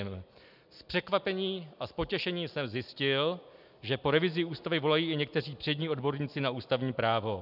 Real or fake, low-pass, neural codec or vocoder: real; 5.4 kHz; none